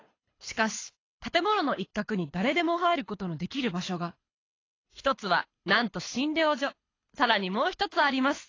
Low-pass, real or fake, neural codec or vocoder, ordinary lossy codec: 7.2 kHz; fake; codec, 24 kHz, 6 kbps, HILCodec; AAC, 32 kbps